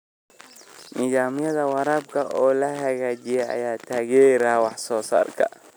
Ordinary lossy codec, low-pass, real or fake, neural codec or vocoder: none; none; real; none